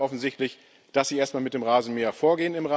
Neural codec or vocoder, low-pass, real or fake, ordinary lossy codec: none; none; real; none